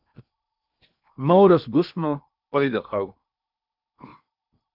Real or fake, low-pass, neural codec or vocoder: fake; 5.4 kHz; codec, 16 kHz in and 24 kHz out, 0.8 kbps, FocalCodec, streaming, 65536 codes